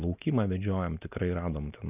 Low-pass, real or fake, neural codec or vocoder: 3.6 kHz; real; none